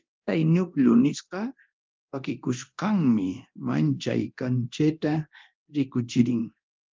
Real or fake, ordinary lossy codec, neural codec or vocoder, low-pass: fake; Opus, 24 kbps; codec, 24 kHz, 0.9 kbps, DualCodec; 7.2 kHz